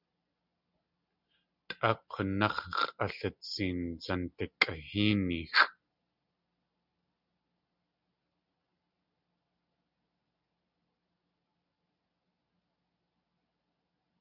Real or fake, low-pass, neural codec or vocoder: real; 5.4 kHz; none